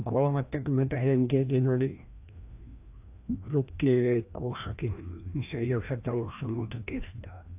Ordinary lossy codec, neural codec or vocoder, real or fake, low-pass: AAC, 32 kbps; codec, 16 kHz, 1 kbps, FreqCodec, larger model; fake; 3.6 kHz